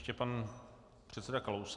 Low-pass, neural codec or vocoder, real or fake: 10.8 kHz; none; real